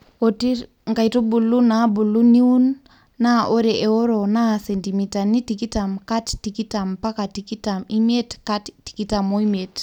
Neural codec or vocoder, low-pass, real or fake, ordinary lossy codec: none; 19.8 kHz; real; none